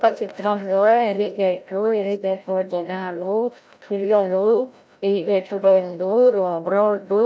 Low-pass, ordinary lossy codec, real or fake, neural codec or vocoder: none; none; fake; codec, 16 kHz, 0.5 kbps, FreqCodec, larger model